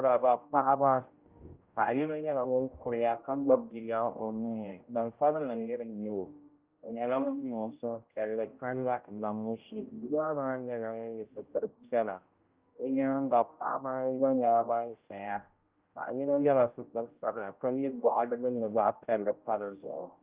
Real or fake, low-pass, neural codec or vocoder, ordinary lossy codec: fake; 3.6 kHz; codec, 16 kHz, 0.5 kbps, X-Codec, HuBERT features, trained on general audio; Opus, 32 kbps